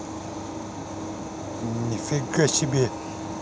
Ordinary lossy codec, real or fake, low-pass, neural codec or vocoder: none; real; none; none